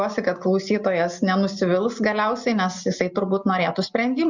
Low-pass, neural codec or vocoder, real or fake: 7.2 kHz; none; real